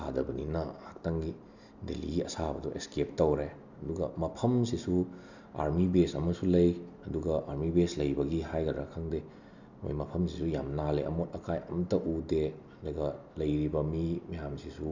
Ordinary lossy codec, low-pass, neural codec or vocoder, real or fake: none; 7.2 kHz; none; real